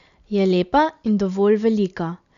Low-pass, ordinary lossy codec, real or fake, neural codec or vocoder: 7.2 kHz; none; real; none